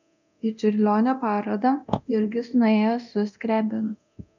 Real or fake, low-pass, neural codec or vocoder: fake; 7.2 kHz; codec, 24 kHz, 0.9 kbps, DualCodec